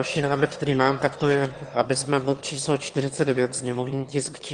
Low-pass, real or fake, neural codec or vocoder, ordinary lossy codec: 9.9 kHz; fake; autoencoder, 22.05 kHz, a latent of 192 numbers a frame, VITS, trained on one speaker; Opus, 24 kbps